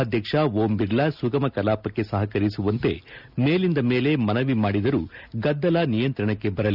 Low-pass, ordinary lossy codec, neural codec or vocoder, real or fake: 5.4 kHz; none; none; real